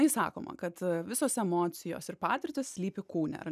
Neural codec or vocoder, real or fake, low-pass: none; real; 14.4 kHz